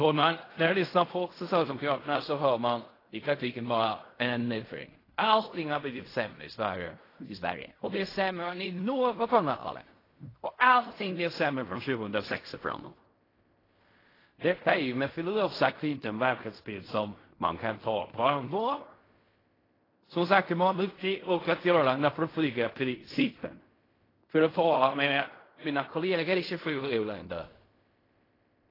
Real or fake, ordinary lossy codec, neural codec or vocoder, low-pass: fake; AAC, 24 kbps; codec, 16 kHz in and 24 kHz out, 0.4 kbps, LongCat-Audio-Codec, fine tuned four codebook decoder; 5.4 kHz